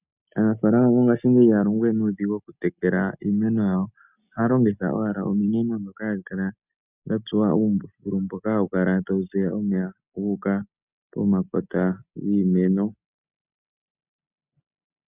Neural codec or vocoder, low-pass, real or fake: none; 3.6 kHz; real